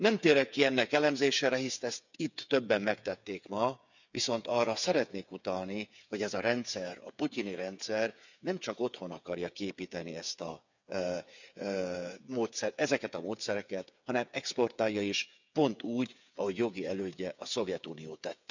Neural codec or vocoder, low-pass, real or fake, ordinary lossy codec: codec, 16 kHz, 8 kbps, FreqCodec, smaller model; 7.2 kHz; fake; none